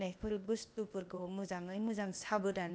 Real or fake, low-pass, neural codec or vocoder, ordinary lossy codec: fake; none; codec, 16 kHz, 0.8 kbps, ZipCodec; none